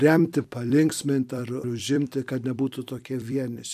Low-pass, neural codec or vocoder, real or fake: 14.4 kHz; vocoder, 44.1 kHz, 128 mel bands every 256 samples, BigVGAN v2; fake